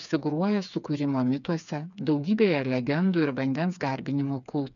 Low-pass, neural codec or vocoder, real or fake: 7.2 kHz; codec, 16 kHz, 4 kbps, FreqCodec, smaller model; fake